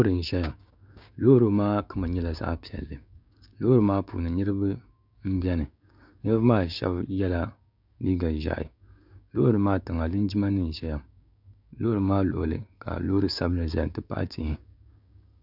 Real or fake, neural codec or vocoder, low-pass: fake; codec, 16 kHz, 6 kbps, DAC; 5.4 kHz